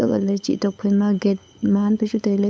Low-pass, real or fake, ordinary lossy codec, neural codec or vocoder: none; fake; none; codec, 16 kHz, 8 kbps, FunCodec, trained on LibriTTS, 25 frames a second